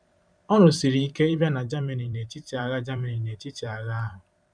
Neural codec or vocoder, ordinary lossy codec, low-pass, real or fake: vocoder, 44.1 kHz, 128 mel bands every 512 samples, BigVGAN v2; none; 9.9 kHz; fake